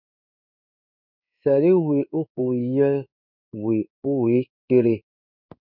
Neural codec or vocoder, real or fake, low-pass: codec, 16 kHz in and 24 kHz out, 1 kbps, XY-Tokenizer; fake; 5.4 kHz